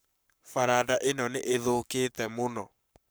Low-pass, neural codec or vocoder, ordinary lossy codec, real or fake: none; codec, 44.1 kHz, 7.8 kbps, Pupu-Codec; none; fake